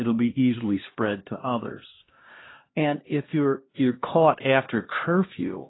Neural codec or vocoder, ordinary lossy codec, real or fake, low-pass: codec, 16 kHz, 1 kbps, X-Codec, HuBERT features, trained on LibriSpeech; AAC, 16 kbps; fake; 7.2 kHz